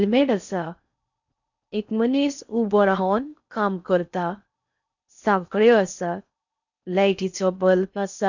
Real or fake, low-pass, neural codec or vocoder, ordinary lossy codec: fake; 7.2 kHz; codec, 16 kHz in and 24 kHz out, 0.6 kbps, FocalCodec, streaming, 4096 codes; none